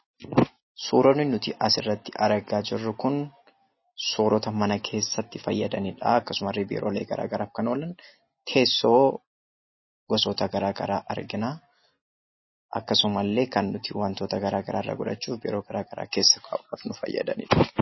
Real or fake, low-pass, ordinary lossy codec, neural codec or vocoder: real; 7.2 kHz; MP3, 24 kbps; none